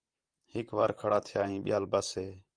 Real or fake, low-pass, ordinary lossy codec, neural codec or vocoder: fake; 9.9 kHz; Opus, 32 kbps; vocoder, 44.1 kHz, 128 mel bands, Pupu-Vocoder